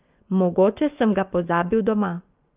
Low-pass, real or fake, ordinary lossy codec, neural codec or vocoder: 3.6 kHz; fake; Opus, 24 kbps; vocoder, 24 kHz, 100 mel bands, Vocos